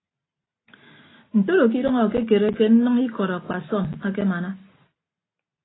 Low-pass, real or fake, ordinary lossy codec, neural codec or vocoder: 7.2 kHz; real; AAC, 16 kbps; none